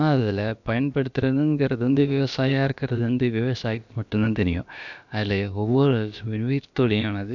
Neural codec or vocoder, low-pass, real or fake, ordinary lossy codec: codec, 16 kHz, about 1 kbps, DyCAST, with the encoder's durations; 7.2 kHz; fake; none